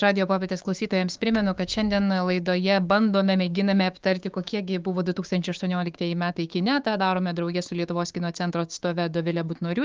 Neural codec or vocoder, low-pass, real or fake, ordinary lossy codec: codec, 16 kHz, 6 kbps, DAC; 7.2 kHz; fake; Opus, 32 kbps